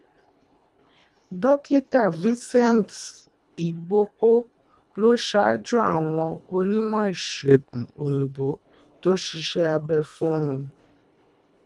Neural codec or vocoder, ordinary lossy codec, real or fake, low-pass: codec, 24 kHz, 1.5 kbps, HILCodec; none; fake; none